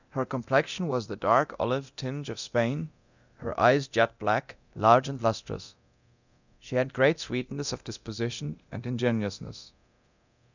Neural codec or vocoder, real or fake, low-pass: codec, 24 kHz, 0.9 kbps, DualCodec; fake; 7.2 kHz